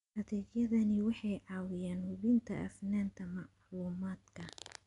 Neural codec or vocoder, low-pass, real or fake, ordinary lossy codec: none; 10.8 kHz; real; MP3, 96 kbps